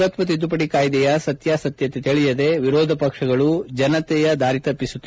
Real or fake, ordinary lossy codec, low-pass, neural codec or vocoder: real; none; none; none